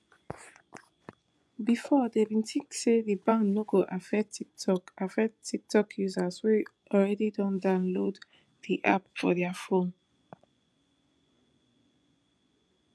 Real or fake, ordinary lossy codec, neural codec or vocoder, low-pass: fake; none; vocoder, 24 kHz, 100 mel bands, Vocos; none